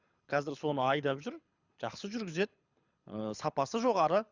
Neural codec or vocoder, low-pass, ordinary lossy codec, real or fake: codec, 24 kHz, 6 kbps, HILCodec; 7.2 kHz; Opus, 64 kbps; fake